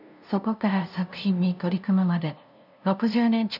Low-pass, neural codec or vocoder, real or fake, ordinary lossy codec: 5.4 kHz; codec, 16 kHz, 0.5 kbps, FunCodec, trained on LibriTTS, 25 frames a second; fake; none